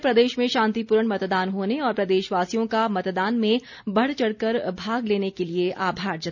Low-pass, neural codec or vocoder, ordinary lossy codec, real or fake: 7.2 kHz; none; none; real